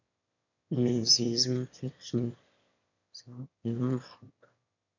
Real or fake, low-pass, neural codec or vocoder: fake; 7.2 kHz; autoencoder, 22.05 kHz, a latent of 192 numbers a frame, VITS, trained on one speaker